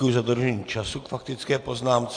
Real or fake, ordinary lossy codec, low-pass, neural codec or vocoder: real; AAC, 64 kbps; 9.9 kHz; none